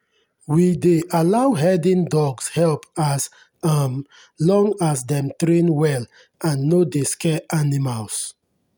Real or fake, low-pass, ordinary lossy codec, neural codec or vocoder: real; none; none; none